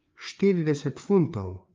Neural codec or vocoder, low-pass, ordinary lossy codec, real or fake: codec, 16 kHz, 4 kbps, FreqCodec, larger model; 7.2 kHz; Opus, 24 kbps; fake